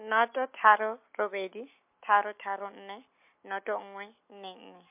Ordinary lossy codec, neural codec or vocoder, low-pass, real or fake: MP3, 32 kbps; none; 3.6 kHz; real